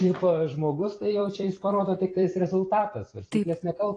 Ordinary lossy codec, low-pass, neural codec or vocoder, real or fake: AAC, 32 kbps; 9.9 kHz; vocoder, 44.1 kHz, 128 mel bands every 256 samples, BigVGAN v2; fake